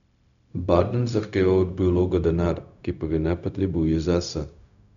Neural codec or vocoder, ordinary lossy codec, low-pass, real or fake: codec, 16 kHz, 0.4 kbps, LongCat-Audio-Codec; none; 7.2 kHz; fake